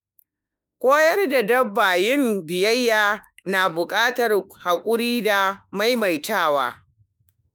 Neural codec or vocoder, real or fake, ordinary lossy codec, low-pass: autoencoder, 48 kHz, 32 numbers a frame, DAC-VAE, trained on Japanese speech; fake; none; none